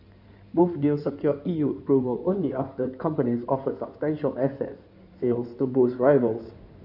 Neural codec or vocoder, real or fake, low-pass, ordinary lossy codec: codec, 16 kHz in and 24 kHz out, 2.2 kbps, FireRedTTS-2 codec; fake; 5.4 kHz; none